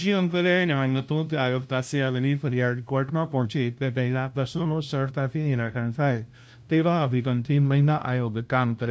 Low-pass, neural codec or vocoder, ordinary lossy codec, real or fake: none; codec, 16 kHz, 0.5 kbps, FunCodec, trained on LibriTTS, 25 frames a second; none; fake